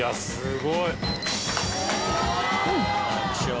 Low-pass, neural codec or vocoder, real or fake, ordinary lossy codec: none; none; real; none